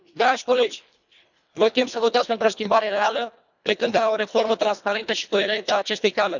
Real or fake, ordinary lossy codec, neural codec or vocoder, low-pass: fake; none; codec, 24 kHz, 1.5 kbps, HILCodec; 7.2 kHz